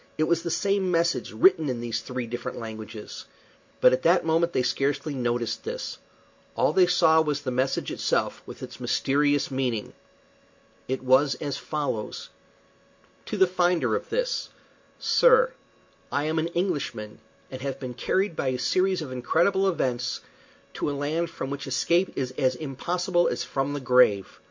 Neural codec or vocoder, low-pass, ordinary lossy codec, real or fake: none; 7.2 kHz; MP3, 64 kbps; real